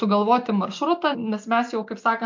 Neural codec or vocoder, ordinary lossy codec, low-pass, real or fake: none; MP3, 64 kbps; 7.2 kHz; real